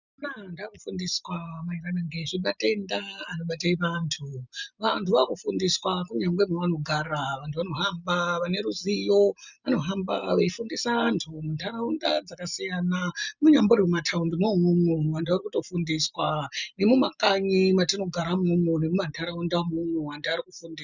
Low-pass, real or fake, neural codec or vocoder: 7.2 kHz; real; none